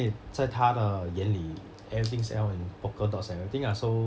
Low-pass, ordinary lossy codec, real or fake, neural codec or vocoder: none; none; real; none